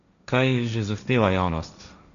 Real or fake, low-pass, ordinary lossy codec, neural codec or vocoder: fake; 7.2 kHz; none; codec, 16 kHz, 1.1 kbps, Voila-Tokenizer